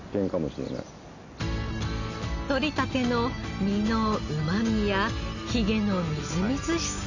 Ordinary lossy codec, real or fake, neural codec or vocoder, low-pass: none; real; none; 7.2 kHz